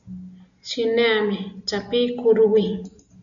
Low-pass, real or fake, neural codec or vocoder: 7.2 kHz; real; none